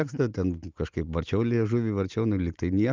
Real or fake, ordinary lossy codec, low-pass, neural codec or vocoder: real; Opus, 32 kbps; 7.2 kHz; none